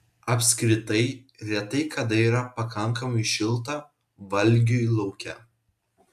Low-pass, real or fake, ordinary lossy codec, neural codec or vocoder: 14.4 kHz; real; AAC, 96 kbps; none